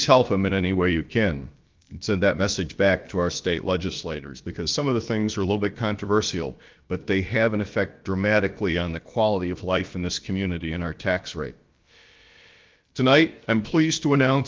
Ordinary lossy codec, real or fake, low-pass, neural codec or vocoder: Opus, 32 kbps; fake; 7.2 kHz; codec, 16 kHz, about 1 kbps, DyCAST, with the encoder's durations